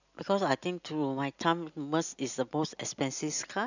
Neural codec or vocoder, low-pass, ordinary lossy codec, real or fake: none; 7.2 kHz; none; real